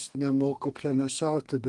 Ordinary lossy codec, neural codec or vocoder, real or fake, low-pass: Opus, 24 kbps; codec, 32 kHz, 1.9 kbps, SNAC; fake; 10.8 kHz